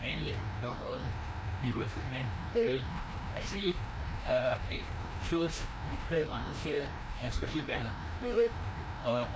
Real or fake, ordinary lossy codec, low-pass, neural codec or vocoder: fake; none; none; codec, 16 kHz, 1 kbps, FreqCodec, larger model